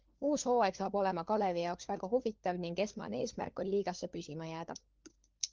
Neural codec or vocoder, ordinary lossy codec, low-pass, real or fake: codec, 16 kHz, 4 kbps, FunCodec, trained on LibriTTS, 50 frames a second; Opus, 32 kbps; 7.2 kHz; fake